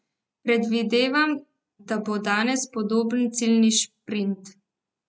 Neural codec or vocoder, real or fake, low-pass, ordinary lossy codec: none; real; none; none